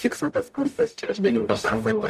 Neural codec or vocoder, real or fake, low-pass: codec, 44.1 kHz, 0.9 kbps, DAC; fake; 14.4 kHz